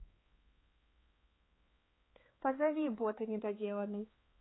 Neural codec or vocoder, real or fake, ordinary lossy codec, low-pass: codec, 16 kHz, 4 kbps, X-Codec, HuBERT features, trained on balanced general audio; fake; AAC, 16 kbps; 7.2 kHz